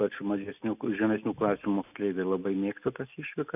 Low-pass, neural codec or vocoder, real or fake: 3.6 kHz; none; real